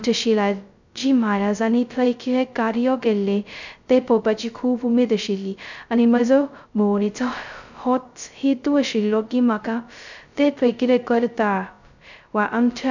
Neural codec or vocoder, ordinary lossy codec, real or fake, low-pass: codec, 16 kHz, 0.2 kbps, FocalCodec; none; fake; 7.2 kHz